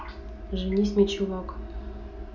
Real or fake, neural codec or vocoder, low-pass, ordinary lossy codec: real; none; 7.2 kHz; none